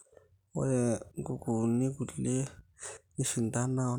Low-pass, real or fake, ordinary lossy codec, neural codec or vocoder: 19.8 kHz; real; none; none